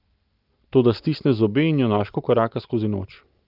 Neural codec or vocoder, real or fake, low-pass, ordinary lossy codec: none; real; 5.4 kHz; Opus, 24 kbps